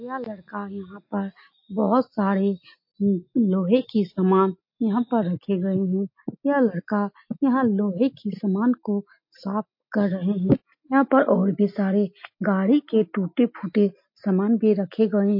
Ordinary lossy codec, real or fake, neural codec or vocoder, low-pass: MP3, 32 kbps; real; none; 5.4 kHz